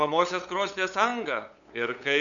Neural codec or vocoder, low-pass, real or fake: codec, 16 kHz, 8 kbps, FunCodec, trained on LibriTTS, 25 frames a second; 7.2 kHz; fake